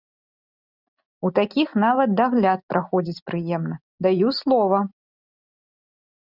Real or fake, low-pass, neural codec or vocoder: real; 5.4 kHz; none